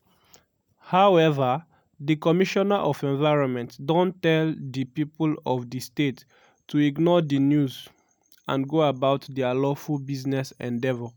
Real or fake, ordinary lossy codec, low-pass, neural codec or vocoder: real; none; none; none